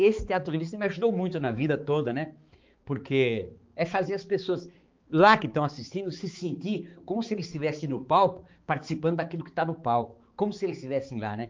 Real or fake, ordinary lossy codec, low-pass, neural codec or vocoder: fake; Opus, 24 kbps; 7.2 kHz; codec, 16 kHz, 4 kbps, X-Codec, HuBERT features, trained on balanced general audio